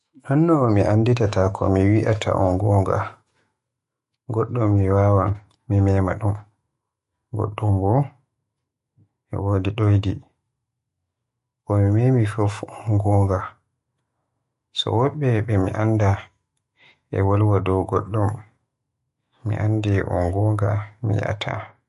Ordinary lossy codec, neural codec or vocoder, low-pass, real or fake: MP3, 48 kbps; autoencoder, 48 kHz, 128 numbers a frame, DAC-VAE, trained on Japanese speech; 14.4 kHz; fake